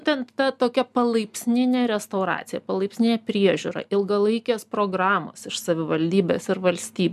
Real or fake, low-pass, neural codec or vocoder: real; 14.4 kHz; none